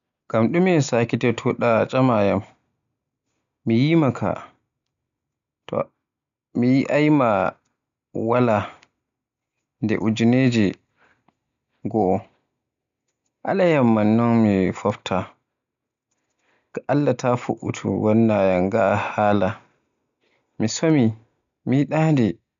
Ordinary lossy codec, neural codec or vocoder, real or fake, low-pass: none; none; real; 7.2 kHz